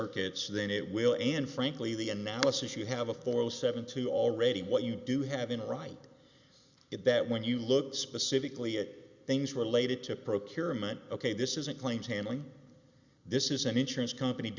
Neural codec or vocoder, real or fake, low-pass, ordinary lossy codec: none; real; 7.2 kHz; Opus, 64 kbps